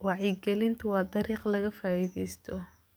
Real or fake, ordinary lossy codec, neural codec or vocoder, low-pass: fake; none; codec, 44.1 kHz, 7.8 kbps, DAC; none